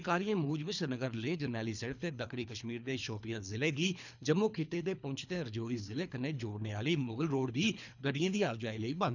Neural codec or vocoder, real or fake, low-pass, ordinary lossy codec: codec, 24 kHz, 3 kbps, HILCodec; fake; 7.2 kHz; none